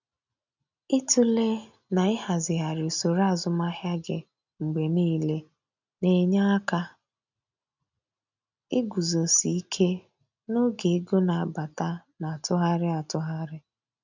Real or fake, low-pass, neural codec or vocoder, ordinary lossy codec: real; 7.2 kHz; none; none